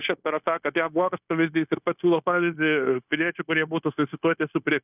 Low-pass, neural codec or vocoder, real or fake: 3.6 kHz; codec, 16 kHz, 0.9 kbps, LongCat-Audio-Codec; fake